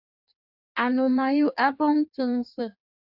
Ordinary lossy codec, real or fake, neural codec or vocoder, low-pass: AAC, 48 kbps; fake; codec, 16 kHz in and 24 kHz out, 1.1 kbps, FireRedTTS-2 codec; 5.4 kHz